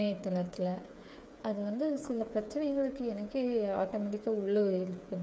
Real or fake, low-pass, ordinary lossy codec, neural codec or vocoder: fake; none; none; codec, 16 kHz, 8 kbps, FreqCodec, smaller model